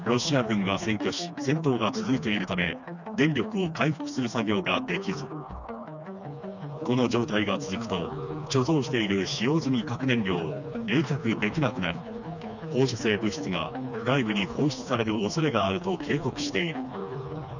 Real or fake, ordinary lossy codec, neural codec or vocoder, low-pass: fake; none; codec, 16 kHz, 2 kbps, FreqCodec, smaller model; 7.2 kHz